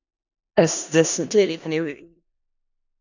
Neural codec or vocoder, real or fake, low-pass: codec, 16 kHz in and 24 kHz out, 0.4 kbps, LongCat-Audio-Codec, four codebook decoder; fake; 7.2 kHz